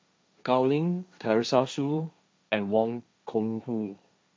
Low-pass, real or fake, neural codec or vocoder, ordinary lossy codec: none; fake; codec, 16 kHz, 1.1 kbps, Voila-Tokenizer; none